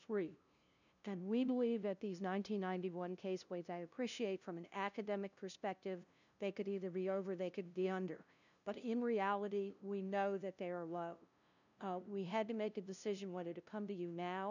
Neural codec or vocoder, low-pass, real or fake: codec, 16 kHz, 0.5 kbps, FunCodec, trained on LibriTTS, 25 frames a second; 7.2 kHz; fake